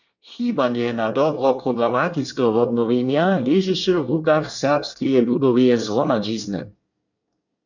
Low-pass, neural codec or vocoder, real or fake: 7.2 kHz; codec, 24 kHz, 1 kbps, SNAC; fake